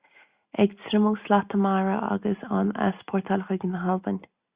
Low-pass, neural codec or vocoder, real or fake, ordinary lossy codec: 3.6 kHz; none; real; Opus, 64 kbps